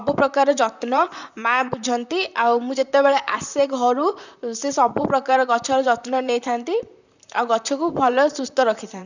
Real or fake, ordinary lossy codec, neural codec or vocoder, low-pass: fake; none; vocoder, 44.1 kHz, 128 mel bands, Pupu-Vocoder; 7.2 kHz